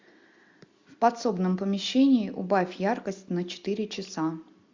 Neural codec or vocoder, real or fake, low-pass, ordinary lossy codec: none; real; 7.2 kHz; MP3, 64 kbps